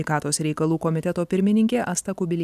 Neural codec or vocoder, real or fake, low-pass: none; real; 14.4 kHz